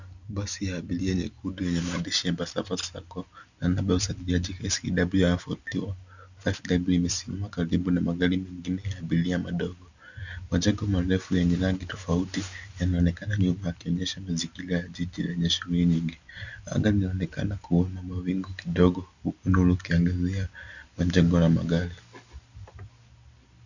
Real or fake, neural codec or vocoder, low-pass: real; none; 7.2 kHz